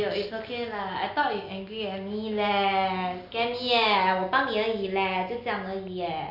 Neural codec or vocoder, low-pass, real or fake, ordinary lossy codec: none; 5.4 kHz; real; none